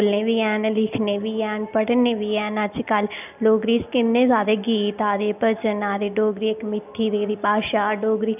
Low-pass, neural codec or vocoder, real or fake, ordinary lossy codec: 3.6 kHz; none; real; none